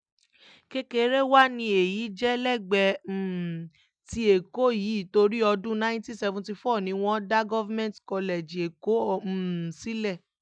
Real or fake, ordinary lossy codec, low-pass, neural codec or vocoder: real; none; 9.9 kHz; none